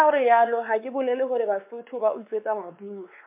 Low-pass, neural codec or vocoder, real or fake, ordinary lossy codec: 3.6 kHz; codec, 16 kHz, 4 kbps, X-Codec, WavLM features, trained on Multilingual LibriSpeech; fake; MP3, 24 kbps